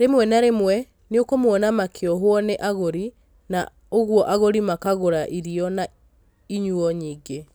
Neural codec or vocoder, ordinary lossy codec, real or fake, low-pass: none; none; real; none